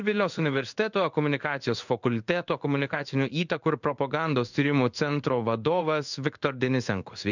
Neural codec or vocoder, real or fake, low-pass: codec, 16 kHz in and 24 kHz out, 1 kbps, XY-Tokenizer; fake; 7.2 kHz